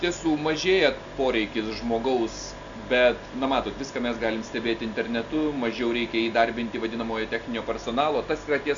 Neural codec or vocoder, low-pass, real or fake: none; 7.2 kHz; real